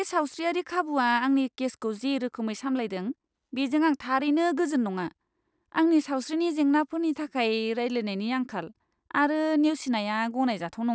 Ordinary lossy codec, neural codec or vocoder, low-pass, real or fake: none; none; none; real